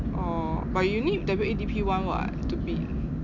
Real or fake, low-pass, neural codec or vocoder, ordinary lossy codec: real; 7.2 kHz; none; none